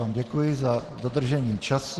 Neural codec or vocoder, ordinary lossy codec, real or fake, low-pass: none; Opus, 16 kbps; real; 10.8 kHz